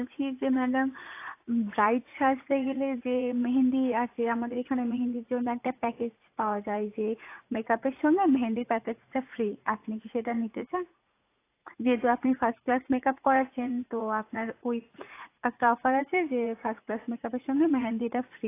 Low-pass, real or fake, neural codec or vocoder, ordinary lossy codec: 3.6 kHz; fake; vocoder, 44.1 kHz, 80 mel bands, Vocos; AAC, 24 kbps